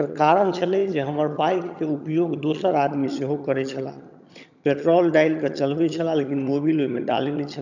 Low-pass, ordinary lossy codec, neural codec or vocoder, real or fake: 7.2 kHz; none; vocoder, 22.05 kHz, 80 mel bands, HiFi-GAN; fake